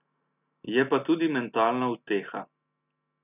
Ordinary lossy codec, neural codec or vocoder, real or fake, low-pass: none; none; real; 3.6 kHz